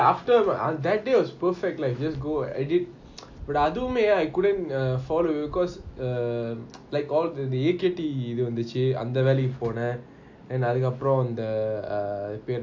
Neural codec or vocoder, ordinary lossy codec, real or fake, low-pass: none; AAC, 48 kbps; real; 7.2 kHz